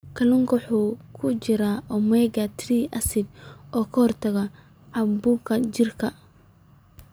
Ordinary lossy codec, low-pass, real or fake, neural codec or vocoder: none; none; real; none